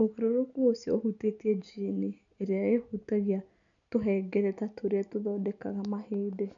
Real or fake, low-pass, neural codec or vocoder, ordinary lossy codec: real; 7.2 kHz; none; none